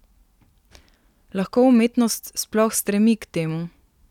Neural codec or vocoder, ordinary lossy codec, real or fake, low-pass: none; none; real; 19.8 kHz